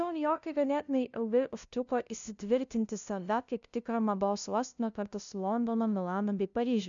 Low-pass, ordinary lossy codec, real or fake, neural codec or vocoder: 7.2 kHz; MP3, 96 kbps; fake; codec, 16 kHz, 0.5 kbps, FunCodec, trained on LibriTTS, 25 frames a second